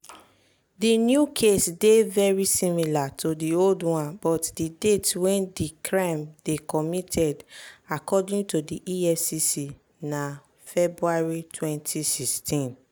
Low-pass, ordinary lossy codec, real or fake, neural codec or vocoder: none; none; real; none